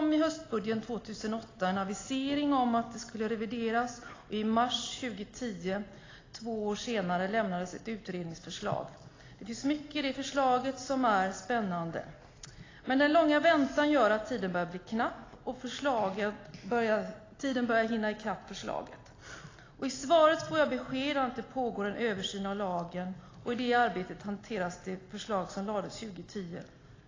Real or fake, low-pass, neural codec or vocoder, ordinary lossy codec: real; 7.2 kHz; none; AAC, 32 kbps